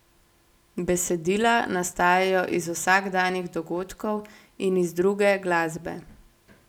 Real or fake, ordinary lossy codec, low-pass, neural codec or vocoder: real; none; 19.8 kHz; none